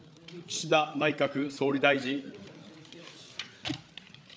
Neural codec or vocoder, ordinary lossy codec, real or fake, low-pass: codec, 16 kHz, 8 kbps, FreqCodec, larger model; none; fake; none